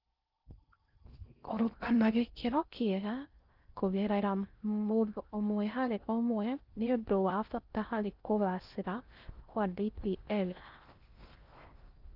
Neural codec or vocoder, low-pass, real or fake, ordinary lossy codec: codec, 16 kHz in and 24 kHz out, 0.6 kbps, FocalCodec, streaming, 4096 codes; 5.4 kHz; fake; Opus, 24 kbps